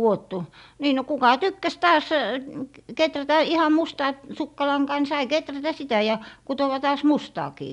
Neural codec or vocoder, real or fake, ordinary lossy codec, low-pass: none; real; none; 10.8 kHz